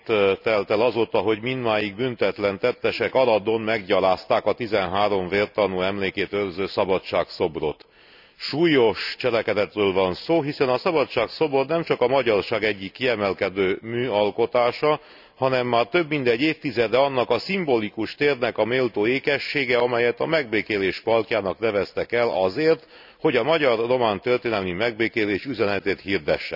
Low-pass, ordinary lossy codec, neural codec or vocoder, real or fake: 5.4 kHz; none; none; real